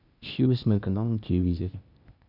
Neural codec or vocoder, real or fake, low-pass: codec, 16 kHz, 0.8 kbps, ZipCodec; fake; 5.4 kHz